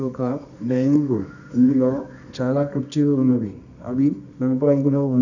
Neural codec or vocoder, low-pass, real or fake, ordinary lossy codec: codec, 24 kHz, 0.9 kbps, WavTokenizer, medium music audio release; 7.2 kHz; fake; none